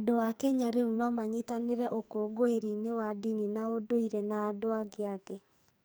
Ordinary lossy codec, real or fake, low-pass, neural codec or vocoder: none; fake; none; codec, 44.1 kHz, 2.6 kbps, SNAC